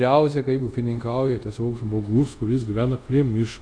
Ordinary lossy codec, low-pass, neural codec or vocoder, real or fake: AAC, 64 kbps; 9.9 kHz; codec, 24 kHz, 0.5 kbps, DualCodec; fake